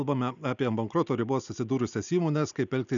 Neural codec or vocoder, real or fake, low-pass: none; real; 7.2 kHz